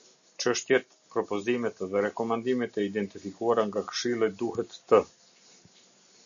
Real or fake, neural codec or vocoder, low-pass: real; none; 7.2 kHz